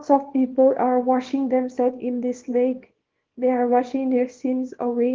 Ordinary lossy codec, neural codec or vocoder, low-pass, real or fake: Opus, 16 kbps; codec, 24 kHz, 0.9 kbps, WavTokenizer, small release; 7.2 kHz; fake